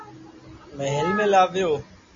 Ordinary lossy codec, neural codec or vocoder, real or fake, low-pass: MP3, 32 kbps; none; real; 7.2 kHz